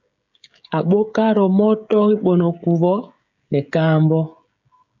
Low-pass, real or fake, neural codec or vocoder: 7.2 kHz; fake; codec, 16 kHz, 16 kbps, FreqCodec, smaller model